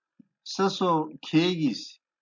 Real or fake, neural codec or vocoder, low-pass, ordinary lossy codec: real; none; 7.2 kHz; MP3, 48 kbps